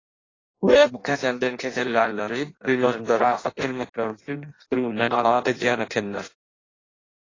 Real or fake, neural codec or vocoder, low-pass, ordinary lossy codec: fake; codec, 16 kHz in and 24 kHz out, 0.6 kbps, FireRedTTS-2 codec; 7.2 kHz; AAC, 32 kbps